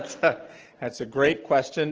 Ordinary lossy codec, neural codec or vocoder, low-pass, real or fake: Opus, 16 kbps; none; 7.2 kHz; real